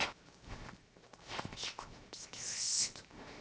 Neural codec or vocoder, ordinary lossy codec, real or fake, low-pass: codec, 16 kHz, 0.7 kbps, FocalCodec; none; fake; none